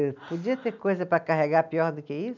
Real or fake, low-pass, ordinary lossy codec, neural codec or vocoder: real; 7.2 kHz; none; none